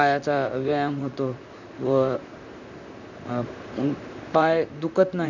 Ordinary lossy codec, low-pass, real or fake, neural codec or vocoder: none; 7.2 kHz; fake; vocoder, 44.1 kHz, 128 mel bands, Pupu-Vocoder